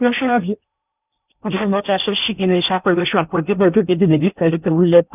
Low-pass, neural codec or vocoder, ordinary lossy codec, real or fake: 3.6 kHz; codec, 16 kHz in and 24 kHz out, 0.8 kbps, FocalCodec, streaming, 65536 codes; none; fake